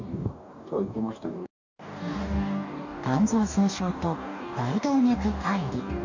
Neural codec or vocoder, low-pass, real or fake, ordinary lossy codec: codec, 44.1 kHz, 2.6 kbps, DAC; 7.2 kHz; fake; none